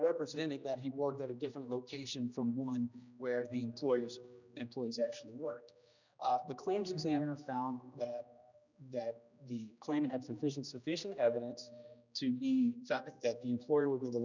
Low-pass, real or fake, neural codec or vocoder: 7.2 kHz; fake; codec, 16 kHz, 1 kbps, X-Codec, HuBERT features, trained on general audio